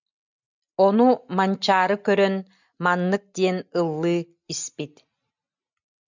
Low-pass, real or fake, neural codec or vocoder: 7.2 kHz; real; none